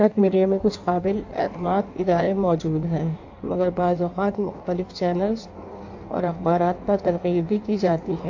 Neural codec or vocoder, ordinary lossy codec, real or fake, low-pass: codec, 16 kHz in and 24 kHz out, 1.1 kbps, FireRedTTS-2 codec; AAC, 48 kbps; fake; 7.2 kHz